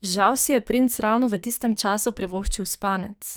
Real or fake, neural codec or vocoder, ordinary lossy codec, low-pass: fake; codec, 44.1 kHz, 2.6 kbps, SNAC; none; none